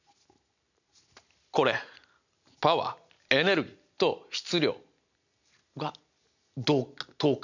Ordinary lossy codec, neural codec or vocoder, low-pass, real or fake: none; none; 7.2 kHz; real